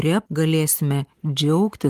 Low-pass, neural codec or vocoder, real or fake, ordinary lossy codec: 14.4 kHz; none; real; Opus, 24 kbps